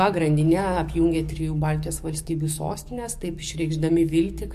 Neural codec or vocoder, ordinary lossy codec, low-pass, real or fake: codec, 44.1 kHz, 7.8 kbps, DAC; MP3, 64 kbps; 14.4 kHz; fake